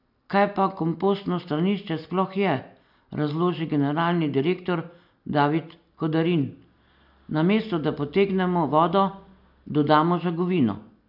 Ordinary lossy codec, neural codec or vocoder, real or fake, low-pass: none; none; real; 5.4 kHz